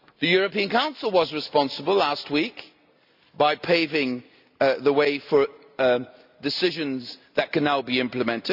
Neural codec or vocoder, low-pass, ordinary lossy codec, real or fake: none; 5.4 kHz; none; real